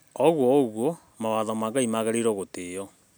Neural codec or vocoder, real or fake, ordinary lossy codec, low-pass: none; real; none; none